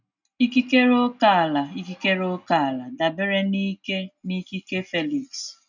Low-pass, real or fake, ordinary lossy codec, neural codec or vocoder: 7.2 kHz; real; none; none